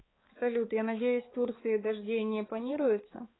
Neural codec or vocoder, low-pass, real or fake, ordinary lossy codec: codec, 16 kHz, 4 kbps, X-Codec, HuBERT features, trained on balanced general audio; 7.2 kHz; fake; AAC, 16 kbps